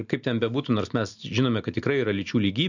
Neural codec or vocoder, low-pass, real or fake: none; 7.2 kHz; real